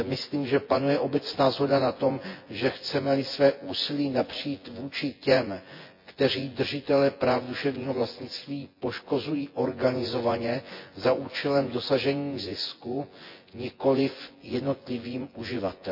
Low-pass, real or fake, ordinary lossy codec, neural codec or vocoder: 5.4 kHz; fake; MP3, 32 kbps; vocoder, 24 kHz, 100 mel bands, Vocos